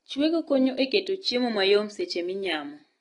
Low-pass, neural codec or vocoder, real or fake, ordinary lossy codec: 10.8 kHz; none; real; AAC, 32 kbps